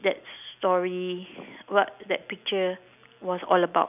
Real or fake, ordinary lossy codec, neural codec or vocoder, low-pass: real; none; none; 3.6 kHz